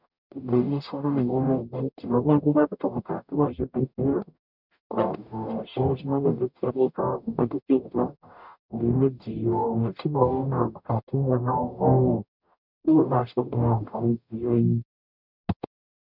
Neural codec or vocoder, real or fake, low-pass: codec, 44.1 kHz, 0.9 kbps, DAC; fake; 5.4 kHz